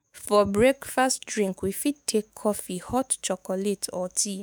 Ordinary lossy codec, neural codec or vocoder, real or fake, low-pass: none; autoencoder, 48 kHz, 128 numbers a frame, DAC-VAE, trained on Japanese speech; fake; none